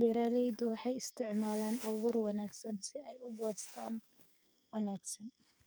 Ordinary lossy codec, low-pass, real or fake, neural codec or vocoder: none; none; fake; codec, 44.1 kHz, 2.6 kbps, SNAC